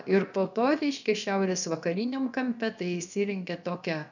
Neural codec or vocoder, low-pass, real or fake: codec, 16 kHz, about 1 kbps, DyCAST, with the encoder's durations; 7.2 kHz; fake